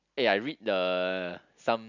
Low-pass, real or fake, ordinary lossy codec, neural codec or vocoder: 7.2 kHz; real; none; none